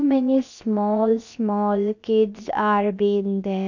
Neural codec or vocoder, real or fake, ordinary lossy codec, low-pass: codec, 16 kHz, 0.7 kbps, FocalCodec; fake; none; 7.2 kHz